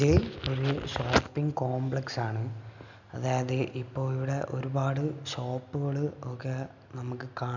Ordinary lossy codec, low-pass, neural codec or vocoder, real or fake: none; 7.2 kHz; none; real